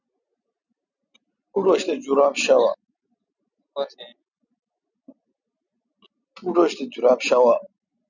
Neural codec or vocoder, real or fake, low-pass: none; real; 7.2 kHz